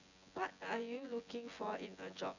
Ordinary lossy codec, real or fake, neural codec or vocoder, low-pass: none; fake; vocoder, 24 kHz, 100 mel bands, Vocos; 7.2 kHz